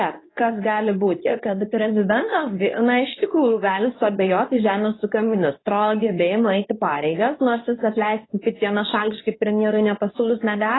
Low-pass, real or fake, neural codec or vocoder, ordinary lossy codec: 7.2 kHz; fake; codec, 24 kHz, 0.9 kbps, WavTokenizer, medium speech release version 2; AAC, 16 kbps